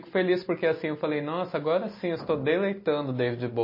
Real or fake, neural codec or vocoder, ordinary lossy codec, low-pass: real; none; MP3, 24 kbps; 5.4 kHz